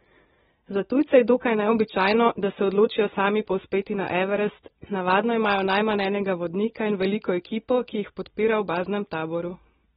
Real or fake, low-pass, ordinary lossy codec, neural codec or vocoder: real; 19.8 kHz; AAC, 16 kbps; none